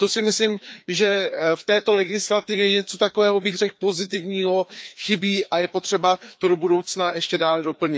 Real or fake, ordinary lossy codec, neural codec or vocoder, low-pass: fake; none; codec, 16 kHz, 2 kbps, FreqCodec, larger model; none